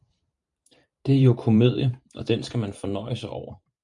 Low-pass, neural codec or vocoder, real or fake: 10.8 kHz; vocoder, 44.1 kHz, 128 mel bands every 512 samples, BigVGAN v2; fake